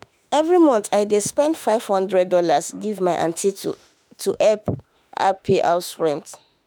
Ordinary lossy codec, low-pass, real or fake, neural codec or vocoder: none; none; fake; autoencoder, 48 kHz, 32 numbers a frame, DAC-VAE, trained on Japanese speech